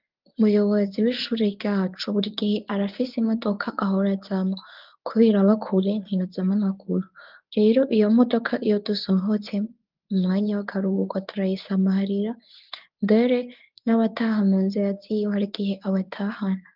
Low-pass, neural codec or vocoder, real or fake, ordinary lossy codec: 5.4 kHz; codec, 24 kHz, 0.9 kbps, WavTokenizer, medium speech release version 1; fake; Opus, 32 kbps